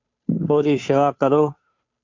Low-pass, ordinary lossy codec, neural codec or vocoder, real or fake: 7.2 kHz; MP3, 48 kbps; codec, 16 kHz, 2 kbps, FunCodec, trained on Chinese and English, 25 frames a second; fake